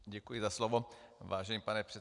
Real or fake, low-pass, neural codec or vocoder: real; 10.8 kHz; none